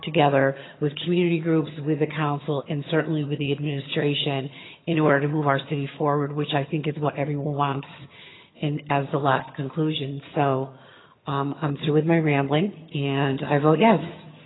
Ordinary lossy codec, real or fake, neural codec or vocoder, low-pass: AAC, 16 kbps; fake; vocoder, 22.05 kHz, 80 mel bands, HiFi-GAN; 7.2 kHz